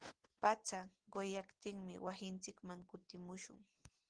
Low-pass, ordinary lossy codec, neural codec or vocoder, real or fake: 9.9 kHz; Opus, 16 kbps; none; real